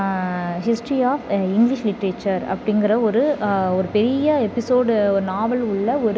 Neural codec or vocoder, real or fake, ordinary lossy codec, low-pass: none; real; none; none